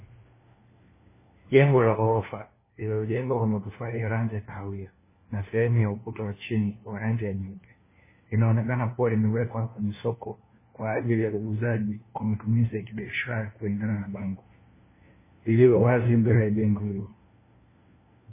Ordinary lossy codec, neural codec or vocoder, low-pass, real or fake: MP3, 16 kbps; codec, 16 kHz, 1 kbps, FunCodec, trained on LibriTTS, 50 frames a second; 3.6 kHz; fake